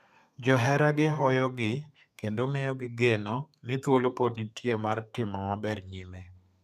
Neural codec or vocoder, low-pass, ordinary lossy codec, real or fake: codec, 32 kHz, 1.9 kbps, SNAC; 14.4 kHz; none; fake